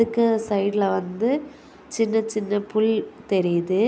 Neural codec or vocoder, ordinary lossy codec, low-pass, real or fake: none; none; none; real